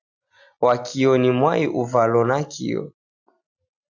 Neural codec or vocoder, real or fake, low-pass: none; real; 7.2 kHz